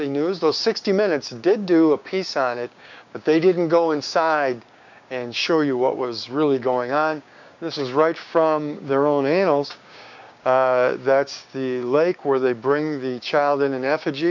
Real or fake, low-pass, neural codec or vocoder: fake; 7.2 kHz; codec, 16 kHz, 6 kbps, DAC